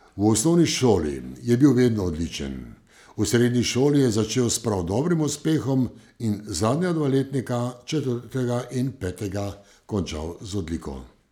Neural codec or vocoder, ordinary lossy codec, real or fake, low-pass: none; none; real; 19.8 kHz